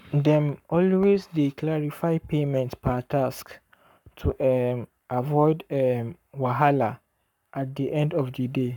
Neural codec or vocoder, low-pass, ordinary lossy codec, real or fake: codec, 44.1 kHz, 7.8 kbps, Pupu-Codec; 19.8 kHz; none; fake